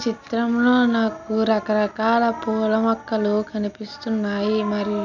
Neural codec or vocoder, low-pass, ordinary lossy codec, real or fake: none; 7.2 kHz; none; real